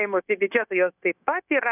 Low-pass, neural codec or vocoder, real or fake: 3.6 kHz; codec, 16 kHz, 0.9 kbps, LongCat-Audio-Codec; fake